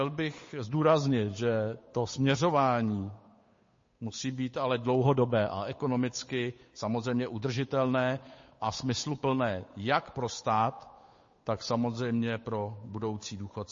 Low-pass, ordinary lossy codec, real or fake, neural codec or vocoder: 7.2 kHz; MP3, 32 kbps; fake; codec, 16 kHz, 8 kbps, FunCodec, trained on Chinese and English, 25 frames a second